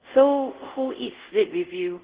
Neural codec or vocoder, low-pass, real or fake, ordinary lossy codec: codec, 24 kHz, 0.5 kbps, DualCodec; 3.6 kHz; fake; Opus, 16 kbps